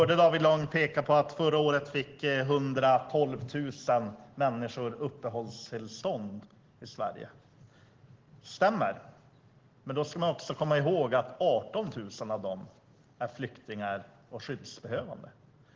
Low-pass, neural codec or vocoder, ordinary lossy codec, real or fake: 7.2 kHz; none; Opus, 16 kbps; real